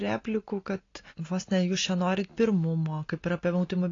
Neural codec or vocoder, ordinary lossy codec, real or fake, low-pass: none; AAC, 32 kbps; real; 7.2 kHz